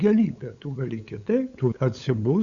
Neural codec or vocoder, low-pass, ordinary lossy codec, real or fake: codec, 16 kHz, 8 kbps, FunCodec, trained on LibriTTS, 25 frames a second; 7.2 kHz; Opus, 64 kbps; fake